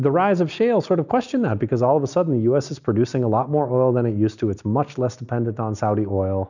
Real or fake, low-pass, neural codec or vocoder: real; 7.2 kHz; none